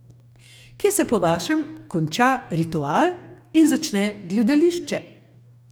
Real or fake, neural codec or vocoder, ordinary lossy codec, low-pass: fake; codec, 44.1 kHz, 2.6 kbps, DAC; none; none